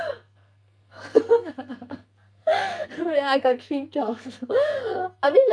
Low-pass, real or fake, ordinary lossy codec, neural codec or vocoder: 9.9 kHz; fake; none; codec, 44.1 kHz, 2.6 kbps, SNAC